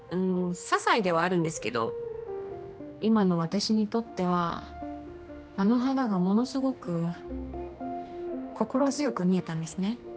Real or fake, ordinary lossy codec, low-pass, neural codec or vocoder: fake; none; none; codec, 16 kHz, 2 kbps, X-Codec, HuBERT features, trained on general audio